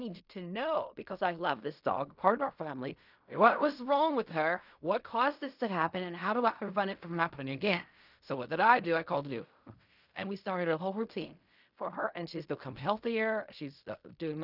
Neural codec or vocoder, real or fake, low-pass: codec, 16 kHz in and 24 kHz out, 0.4 kbps, LongCat-Audio-Codec, fine tuned four codebook decoder; fake; 5.4 kHz